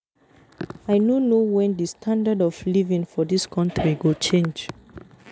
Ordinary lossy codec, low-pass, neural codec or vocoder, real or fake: none; none; none; real